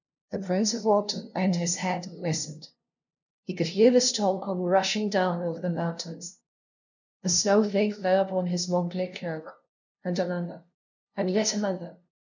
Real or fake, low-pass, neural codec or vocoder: fake; 7.2 kHz; codec, 16 kHz, 0.5 kbps, FunCodec, trained on LibriTTS, 25 frames a second